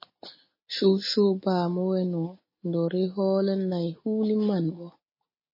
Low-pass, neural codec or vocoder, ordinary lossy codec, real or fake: 5.4 kHz; none; MP3, 24 kbps; real